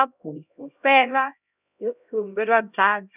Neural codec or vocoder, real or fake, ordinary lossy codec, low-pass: codec, 16 kHz, 0.5 kbps, X-Codec, HuBERT features, trained on LibriSpeech; fake; none; 3.6 kHz